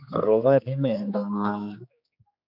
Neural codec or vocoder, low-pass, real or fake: codec, 16 kHz, 2 kbps, X-Codec, HuBERT features, trained on general audio; 5.4 kHz; fake